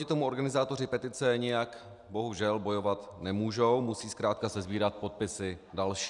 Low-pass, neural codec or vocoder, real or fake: 10.8 kHz; none; real